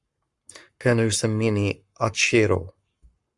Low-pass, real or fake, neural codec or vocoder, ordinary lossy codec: 10.8 kHz; fake; codec, 44.1 kHz, 7.8 kbps, Pupu-Codec; Opus, 64 kbps